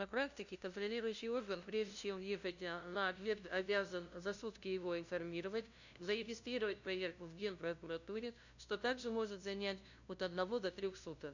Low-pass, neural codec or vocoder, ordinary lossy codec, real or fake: 7.2 kHz; codec, 16 kHz, 0.5 kbps, FunCodec, trained on LibriTTS, 25 frames a second; none; fake